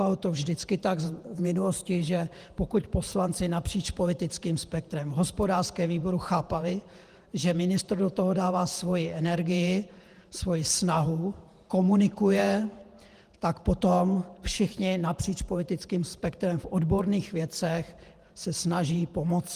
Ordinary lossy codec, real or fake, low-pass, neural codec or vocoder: Opus, 24 kbps; fake; 14.4 kHz; vocoder, 48 kHz, 128 mel bands, Vocos